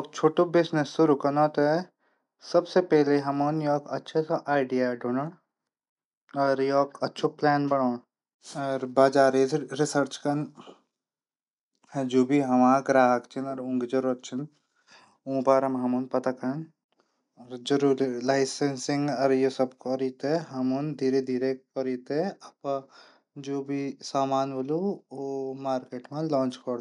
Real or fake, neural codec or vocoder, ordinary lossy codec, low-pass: real; none; MP3, 96 kbps; 10.8 kHz